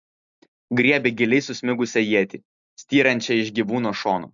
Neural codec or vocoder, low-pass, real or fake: none; 7.2 kHz; real